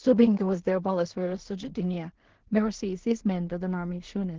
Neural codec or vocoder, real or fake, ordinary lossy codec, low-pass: codec, 16 kHz in and 24 kHz out, 0.4 kbps, LongCat-Audio-Codec, two codebook decoder; fake; Opus, 16 kbps; 7.2 kHz